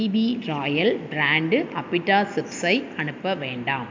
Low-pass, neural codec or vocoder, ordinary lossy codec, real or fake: 7.2 kHz; none; MP3, 64 kbps; real